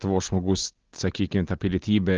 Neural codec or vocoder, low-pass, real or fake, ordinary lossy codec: none; 7.2 kHz; real; Opus, 16 kbps